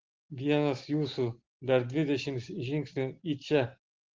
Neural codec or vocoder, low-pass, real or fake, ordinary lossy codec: none; 7.2 kHz; real; Opus, 16 kbps